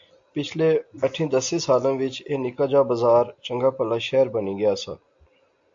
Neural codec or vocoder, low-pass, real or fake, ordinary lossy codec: none; 7.2 kHz; real; AAC, 64 kbps